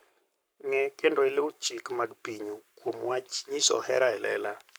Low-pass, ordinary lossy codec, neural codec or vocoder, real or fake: none; none; codec, 44.1 kHz, 7.8 kbps, Pupu-Codec; fake